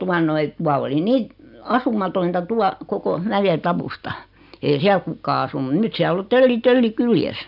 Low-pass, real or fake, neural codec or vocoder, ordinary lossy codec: 5.4 kHz; real; none; none